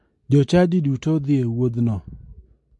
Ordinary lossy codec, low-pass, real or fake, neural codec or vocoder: MP3, 48 kbps; 10.8 kHz; real; none